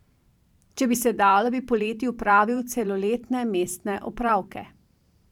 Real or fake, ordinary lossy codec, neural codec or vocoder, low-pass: fake; none; vocoder, 44.1 kHz, 128 mel bands every 512 samples, BigVGAN v2; 19.8 kHz